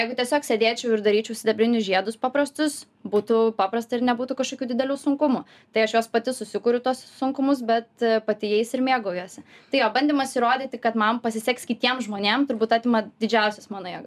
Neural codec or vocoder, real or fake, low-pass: none; real; 14.4 kHz